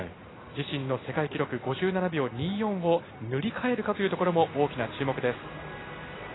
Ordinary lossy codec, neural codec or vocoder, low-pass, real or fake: AAC, 16 kbps; none; 7.2 kHz; real